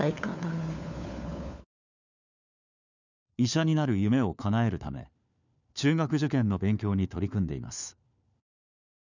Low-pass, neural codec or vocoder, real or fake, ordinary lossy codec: 7.2 kHz; codec, 16 kHz, 4 kbps, FunCodec, trained on LibriTTS, 50 frames a second; fake; none